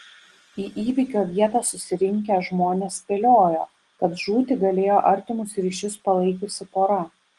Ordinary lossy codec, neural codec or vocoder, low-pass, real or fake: Opus, 24 kbps; none; 10.8 kHz; real